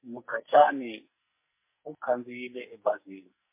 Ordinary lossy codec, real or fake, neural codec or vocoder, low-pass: MP3, 24 kbps; fake; codec, 44.1 kHz, 2.6 kbps, SNAC; 3.6 kHz